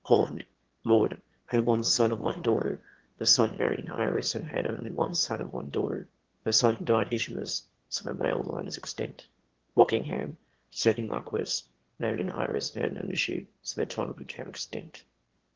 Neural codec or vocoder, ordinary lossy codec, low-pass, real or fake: autoencoder, 22.05 kHz, a latent of 192 numbers a frame, VITS, trained on one speaker; Opus, 16 kbps; 7.2 kHz; fake